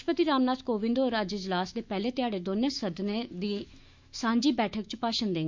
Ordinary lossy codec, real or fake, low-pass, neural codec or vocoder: MP3, 64 kbps; fake; 7.2 kHz; autoencoder, 48 kHz, 128 numbers a frame, DAC-VAE, trained on Japanese speech